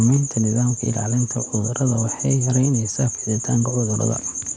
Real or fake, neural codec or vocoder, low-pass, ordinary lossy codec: real; none; none; none